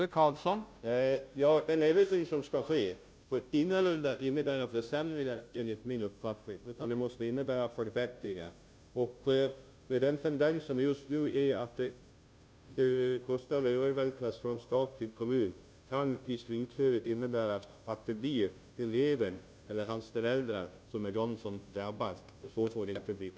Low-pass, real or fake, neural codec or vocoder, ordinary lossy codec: none; fake; codec, 16 kHz, 0.5 kbps, FunCodec, trained on Chinese and English, 25 frames a second; none